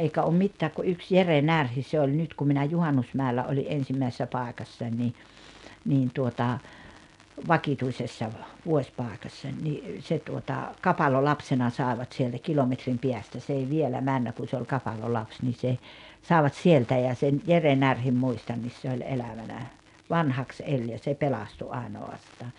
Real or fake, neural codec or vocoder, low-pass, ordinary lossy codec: real; none; 10.8 kHz; none